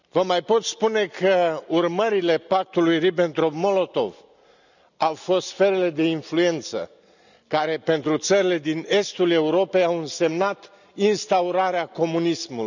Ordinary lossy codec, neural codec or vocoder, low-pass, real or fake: none; none; 7.2 kHz; real